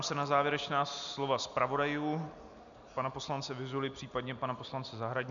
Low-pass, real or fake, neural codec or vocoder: 7.2 kHz; real; none